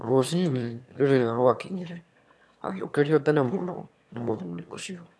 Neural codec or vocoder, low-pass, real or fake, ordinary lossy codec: autoencoder, 22.05 kHz, a latent of 192 numbers a frame, VITS, trained on one speaker; none; fake; none